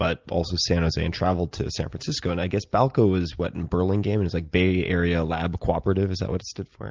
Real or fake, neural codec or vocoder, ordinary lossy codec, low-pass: real; none; Opus, 24 kbps; 7.2 kHz